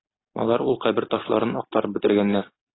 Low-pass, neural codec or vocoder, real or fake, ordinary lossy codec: 7.2 kHz; none; real; AAC, 16 kbps